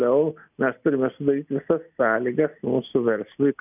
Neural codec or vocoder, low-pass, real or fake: none; 3.6 kHz; real